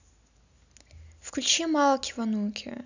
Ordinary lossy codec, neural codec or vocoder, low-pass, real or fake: none; none; 7.2 kHz; real